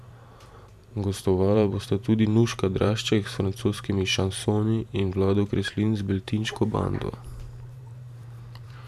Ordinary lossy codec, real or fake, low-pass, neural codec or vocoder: none; fake; 14.4 kHz; vocoder, 44.1 kHz, 128 mel bands every 512 samples, BigVGAN v2